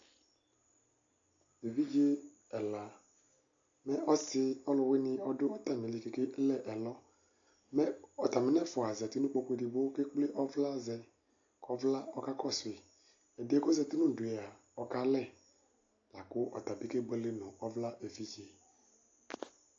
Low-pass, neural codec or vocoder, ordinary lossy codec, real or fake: 7.2 kHz; none; AAC, 48 kbps; real